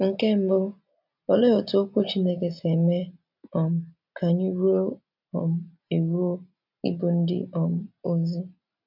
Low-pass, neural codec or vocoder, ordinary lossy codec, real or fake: 5.4 kHz; none; none; real